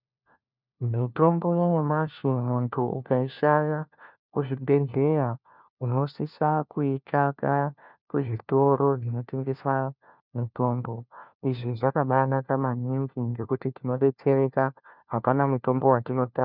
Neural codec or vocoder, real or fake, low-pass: codec, 16 kHz, 1 kbps, FunCodec, trained on LibriTTS, 50 frames a second; fake; 5.4 kHz